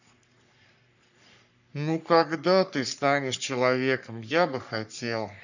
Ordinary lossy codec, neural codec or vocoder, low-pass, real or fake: none; codec, 44.1 kHz, 3.4 kbps, Pupu-Codec; 7.2 kHz; fake